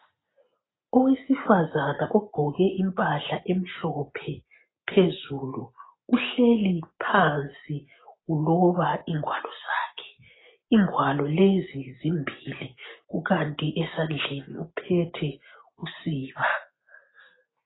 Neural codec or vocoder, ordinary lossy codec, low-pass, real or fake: none; AAC, 16 kbps; 7.2 kHz; real